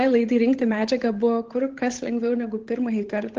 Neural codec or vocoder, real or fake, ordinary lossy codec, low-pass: codec, 16 kHz, 8 kbps, FunCodec, trained on Chinese and English, 25 frames a second; fake; Opus, 16 kbps; 7.2 kHz